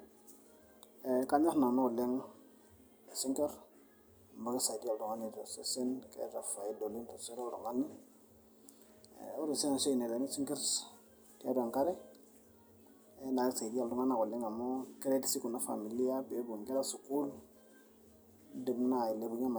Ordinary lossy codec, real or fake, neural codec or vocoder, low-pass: none; real; none; none